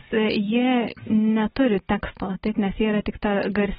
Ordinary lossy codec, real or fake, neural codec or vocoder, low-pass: AAC, 16 kbps; real; none; 10.8 kHz